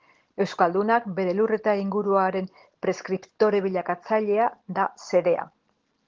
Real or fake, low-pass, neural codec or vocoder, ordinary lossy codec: real; 7.2 kHz; none; Opus, 16 kbps